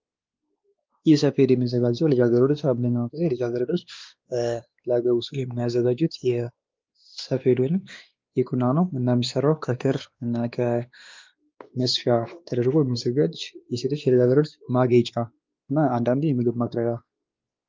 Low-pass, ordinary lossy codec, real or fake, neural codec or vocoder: 7.2 kHz; Opus, 24 kbps; fake; codec, 16 kHz, 2 kbps, X-Codec, WavLM features, trained on Multilingual LibriSpeech